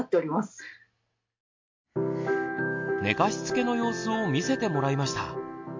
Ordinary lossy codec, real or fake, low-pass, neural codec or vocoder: MP3, 48 kbps; real; 7.2 kHz; none